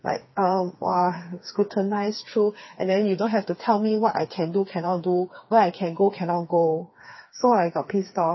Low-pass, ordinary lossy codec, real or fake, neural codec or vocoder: 7.2 kHz; MP3, 24 kbps; fake; codec, 16 kHz, 4 kbps, FreqCodec, smaller model